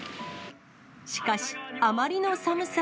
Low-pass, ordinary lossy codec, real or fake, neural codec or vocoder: none; none; real; none